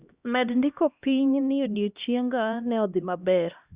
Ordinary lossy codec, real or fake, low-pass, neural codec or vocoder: Opus, 64 kbps; fake; 3.6 kHz; codec, 16 kHz, 1 kbps, X-Codec, HuBERT features, trained on LibriSpeech